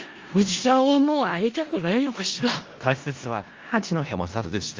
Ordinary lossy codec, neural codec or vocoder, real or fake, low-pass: Opus, 32 kbps; codec, 16 kHz in and 24 kHz out, 0.4 kbps, LongCat-Audio-Codec, four codebook decoder; fake; 7.2 kHz